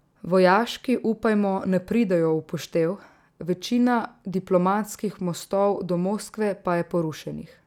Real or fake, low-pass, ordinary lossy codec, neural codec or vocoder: real; 19.8 kHz; none; none